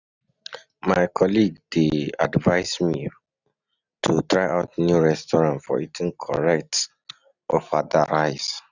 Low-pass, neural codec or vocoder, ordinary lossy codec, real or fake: 7.2 kHz; none; none; real